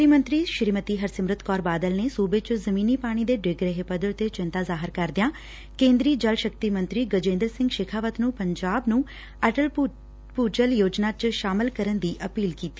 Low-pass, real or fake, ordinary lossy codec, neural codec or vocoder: none; real; none; none